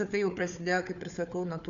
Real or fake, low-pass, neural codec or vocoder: fake; 7.2 kHz; codec, 16 kHz, 4 kbps, FunCodec, trained on Chinese and English, 50 frames a second